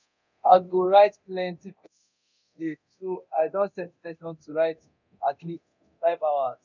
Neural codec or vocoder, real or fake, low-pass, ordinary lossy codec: codec, 24 kHz, 0.9 kbps, DualCodec; fake; 7.2 kHz; none